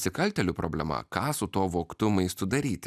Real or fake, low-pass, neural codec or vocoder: real; 14.4 kHz; none